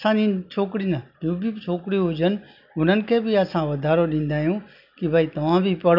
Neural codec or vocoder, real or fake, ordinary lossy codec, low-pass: none; real; none; 5.4 kHz